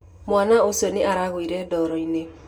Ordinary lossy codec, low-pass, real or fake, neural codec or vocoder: none; 19.8 kHz; real; none